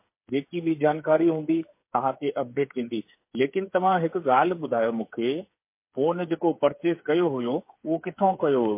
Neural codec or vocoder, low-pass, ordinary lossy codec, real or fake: codec, 16 kHz, 8 kbps, FreqCodec, smaller model; 3.6 kHz; MP3, 24 kbps; fake